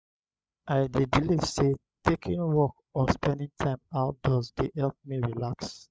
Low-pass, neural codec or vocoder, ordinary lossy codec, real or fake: none; codec, 16 kHz, 8 kbps, FreqCodec, larger model; none; fake